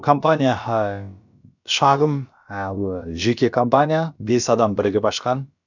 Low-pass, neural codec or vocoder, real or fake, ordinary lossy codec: 7.2 kHz; codec, 16 kHz, about 1 kbps, DyCAST, with the encoder's durations; fake; none